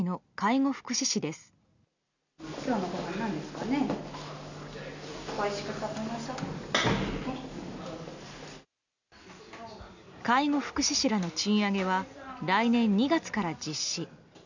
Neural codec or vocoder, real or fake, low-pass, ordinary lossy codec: none; real; 7.2 kHz; none